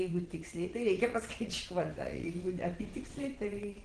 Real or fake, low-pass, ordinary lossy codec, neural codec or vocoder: fake; 9.9 kHz; Opus, 16 kbps; vocoder, 22.05 kHz, 80 mel bands, WaveNeXt